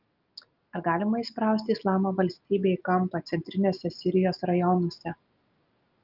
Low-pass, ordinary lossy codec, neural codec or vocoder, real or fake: 5.4 kHz; Opus, 24 kbps; none; real